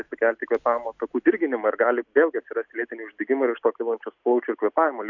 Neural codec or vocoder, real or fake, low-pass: none; real; 7.2 kHz